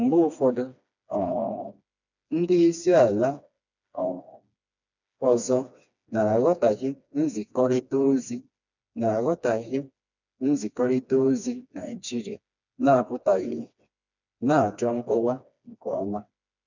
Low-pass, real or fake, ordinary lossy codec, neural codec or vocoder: 7.2 kHz; fake; none; codec, 16 kHz, 2 kbps, FreqCodec, smaller model